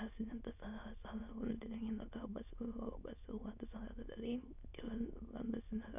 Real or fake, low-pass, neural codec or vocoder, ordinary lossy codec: fake; 3.6 kHz; autoencoder, 22.05 kHz, a latent of 192 numbers a frame, VITS, trained on many speakers; none